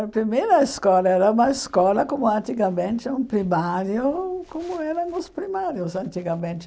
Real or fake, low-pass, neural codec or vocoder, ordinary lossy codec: real; none; none; none